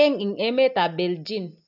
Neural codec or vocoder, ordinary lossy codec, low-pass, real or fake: none; none; 5.4 kHz; real